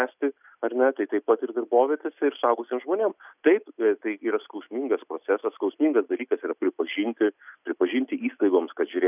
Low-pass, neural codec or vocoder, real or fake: 3.6 kHz; none; real